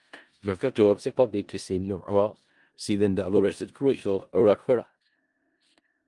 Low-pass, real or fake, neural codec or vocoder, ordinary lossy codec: 10.8 kHz; fake; codec, 16 kHz in and 24 kHz out, 0.4 kbps, LongCat-Audio-Codec, four codebook decoder; Opus, 32 kbps